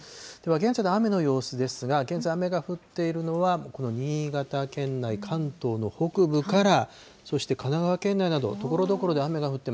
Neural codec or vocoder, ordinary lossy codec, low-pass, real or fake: none; none; none; real